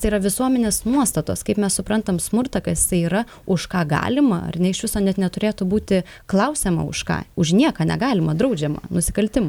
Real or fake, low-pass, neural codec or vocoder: real; 19.8 kHz; none